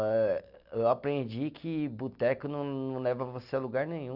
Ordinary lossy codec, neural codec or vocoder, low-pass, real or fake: none; none; 5.4 kHz; real